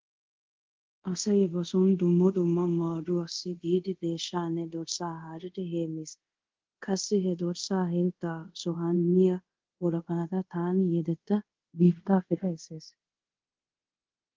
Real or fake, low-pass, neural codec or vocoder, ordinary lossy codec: fake; 7.2 kHz; codec, 24 kHz, 0.5 kbps, DualCodec; Opus, 16 kbps